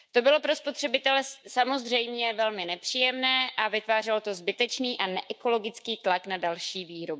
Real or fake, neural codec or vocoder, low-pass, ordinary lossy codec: fake; codec, 16 kHz, 6 kbps, DAC; none; none